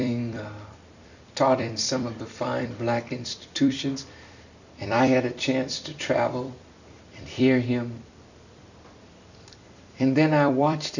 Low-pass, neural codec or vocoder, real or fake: 7.2 kHz; vocoder, 44.1 kHz, 128 mel bands every 256 samples, BigVGAN v2; fake